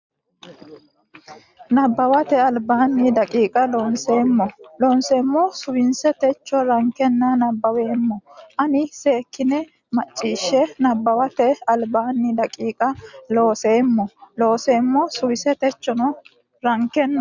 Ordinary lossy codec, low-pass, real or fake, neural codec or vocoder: Opus, 64 kbps; 7.2 kHz; real; none